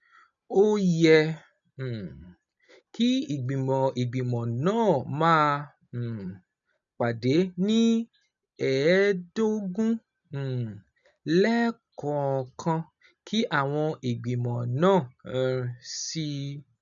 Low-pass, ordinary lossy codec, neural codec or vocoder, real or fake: 7.2 kHz; none; none; real